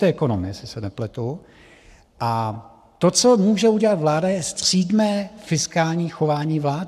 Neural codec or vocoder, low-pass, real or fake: codec, 44.1 kHz, 7.8 kbps, Pupu-Codec; 14.4 kHz; fake